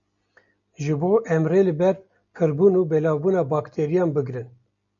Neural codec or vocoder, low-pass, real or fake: none; 7.2 kHz; real